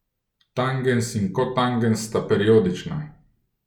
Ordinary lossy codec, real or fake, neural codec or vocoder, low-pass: none; real; none; 19.8 kHz